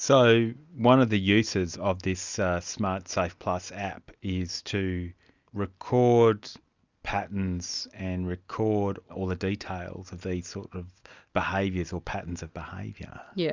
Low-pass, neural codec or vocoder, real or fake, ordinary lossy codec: 7.2 kHz; none; real; Opus, 64 kbps